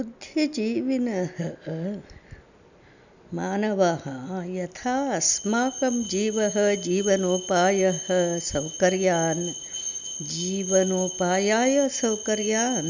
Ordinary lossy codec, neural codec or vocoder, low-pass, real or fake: none; none; 7.2 kHz; real